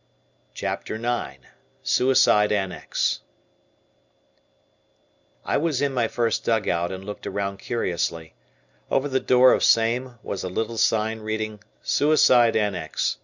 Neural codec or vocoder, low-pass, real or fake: none; 7.2 kHz; real